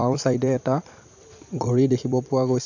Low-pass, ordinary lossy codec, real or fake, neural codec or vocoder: 7.2 kHz; none; fake; vocoder, 44.1 kHz, 128 mel bands every 256 samples, BigVGAN v2